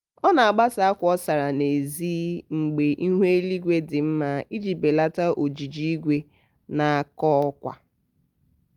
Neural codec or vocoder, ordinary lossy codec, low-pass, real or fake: none; Opus, 32 kbps; 19.8 kHz; real